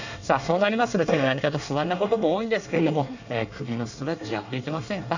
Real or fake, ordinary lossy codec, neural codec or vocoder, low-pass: fake; none; codec, 24 kHz, 1 kbps, SNAC; 7.2 kHz